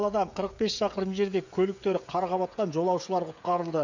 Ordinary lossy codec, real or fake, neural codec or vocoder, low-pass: none; fake; codec, 16 kHz, 16 kbps, FreqCodec, smaller model; 7.2 kHz